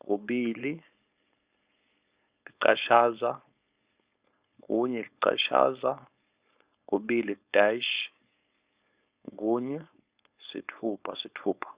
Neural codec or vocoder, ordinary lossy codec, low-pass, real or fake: codec, 16 kHz, 4.8 kbps, FACodec; Opus, 64 kbps; 3.6 kHz; fake